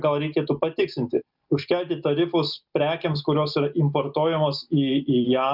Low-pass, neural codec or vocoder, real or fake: 5.4 kHz; none; real